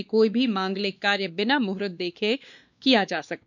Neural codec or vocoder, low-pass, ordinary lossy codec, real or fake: codec, 16 kHz, 2 kbps, X-Codec, WavLM features, trained on Multilingual LibriSpeech; 7.2 kHz; none; fake